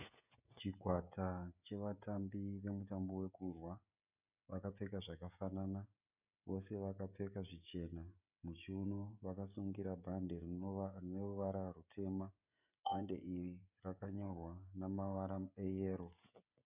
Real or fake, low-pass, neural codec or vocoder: fake; 3.6 kHz; codec, 16 kHz, 16 kbps, FreqCodec, smaller model